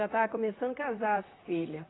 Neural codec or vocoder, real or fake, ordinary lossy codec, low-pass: vocoder, 44.1 kHz, 128 mel bands, Pupu-Vocoder; fake; AAC, 16 kbps; 7.2 kHz